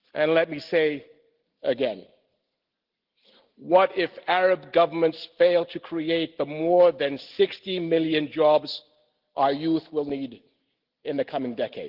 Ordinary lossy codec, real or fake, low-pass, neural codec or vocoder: Opus, 16 kbps; real; 5.4 kHz; none